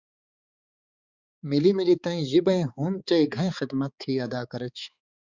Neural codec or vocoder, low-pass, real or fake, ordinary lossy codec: codec, 16 kHz, 4 kbps, X-Codec, HuBERT features, trained on balanced general audio; 7.2 kHz; fake; Opus, 64 kbps